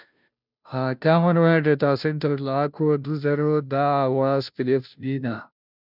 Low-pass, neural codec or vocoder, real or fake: 5.4 kHz; codec, 16 kHz, 0.5 kbps, FunCodec, trained on Chinese and English, 25 frames a second; fake